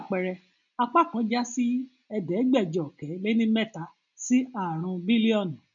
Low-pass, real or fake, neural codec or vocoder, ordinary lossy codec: 7.2 kHz; real; none; none